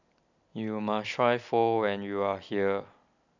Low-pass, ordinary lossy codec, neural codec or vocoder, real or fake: 7.2 kHz; none; none; real